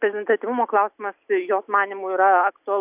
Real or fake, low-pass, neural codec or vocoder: real; 3.6 kHz; none